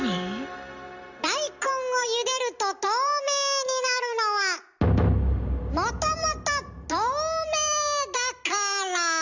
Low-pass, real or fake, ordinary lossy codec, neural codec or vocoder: 7.2 kHz; real; none; none